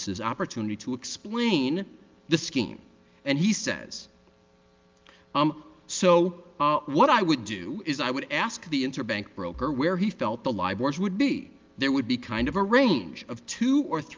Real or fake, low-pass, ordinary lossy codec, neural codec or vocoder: real; 7.2 kHz; Opus, 32 kbps; none